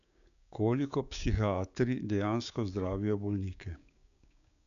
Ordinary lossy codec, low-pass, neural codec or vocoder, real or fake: none; 7.2 kHz; codec, 16 kHz, 6 kbps, DAC; fake